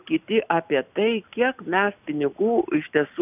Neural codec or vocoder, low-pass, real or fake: none; 3.6 kHz; real